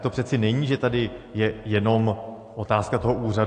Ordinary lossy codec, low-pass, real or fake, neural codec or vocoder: AAC, 48 kbps; 9.9 kHz; real; none